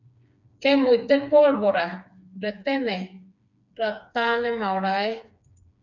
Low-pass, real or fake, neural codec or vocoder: 7.2 kHz; fake; codec, 16 kHz, 4 kbps, FreqCodec, smaller model